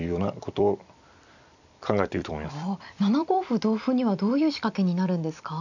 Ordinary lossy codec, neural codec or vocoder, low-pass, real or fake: none; vocoder, 44.1 kHz, 128 mel bands every 256 samples, BigVGAN v2; 7.2 kHz; fake